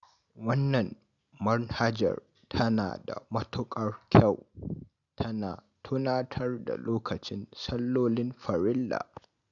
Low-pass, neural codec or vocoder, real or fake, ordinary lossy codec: 7.2 kHz; none; real; none